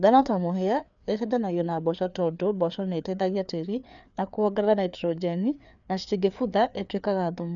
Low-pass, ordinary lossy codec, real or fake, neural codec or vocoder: 7.2 kHz; none; fake; codec, 16 kHz, 4 kbps, FreqCodec, larger model